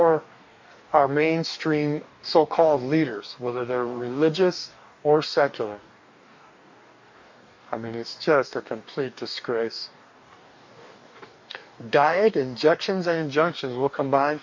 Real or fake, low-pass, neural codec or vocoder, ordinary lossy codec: fake; 7.2 kHz; codec, 44.1 kHz, 2.6 kbps, DAC; MP3, 48 kbps